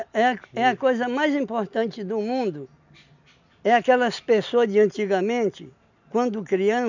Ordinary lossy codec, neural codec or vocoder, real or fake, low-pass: none; none; real; 7.2 kHz